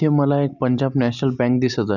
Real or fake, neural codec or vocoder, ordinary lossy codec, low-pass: real; none; none; 7.2 kHz